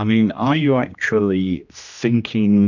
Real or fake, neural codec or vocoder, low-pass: fake; codec, 16 kHz, 1 kbps, X-Codec, HuBERT features, trained on general audio; 7.2 kHz